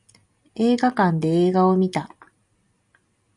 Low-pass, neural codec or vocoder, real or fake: 10.8 kHz; none; real